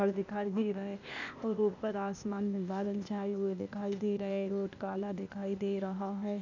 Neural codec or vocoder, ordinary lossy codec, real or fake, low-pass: codec, 16 kHz, 0.8 kbps, ZipCodec; none; fake; 7.2 kHz